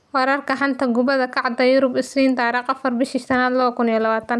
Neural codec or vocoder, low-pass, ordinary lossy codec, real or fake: none; none; none; real